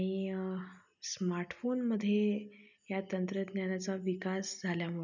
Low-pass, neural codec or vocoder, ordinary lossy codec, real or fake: 7.2 kHz; none; none; real